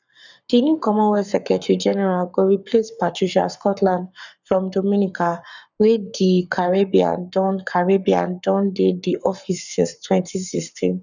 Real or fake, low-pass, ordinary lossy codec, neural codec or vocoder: fake; 7.2 kHz; none; codec, 44.1 kHz, 7.8 kbps, Pupu-Codec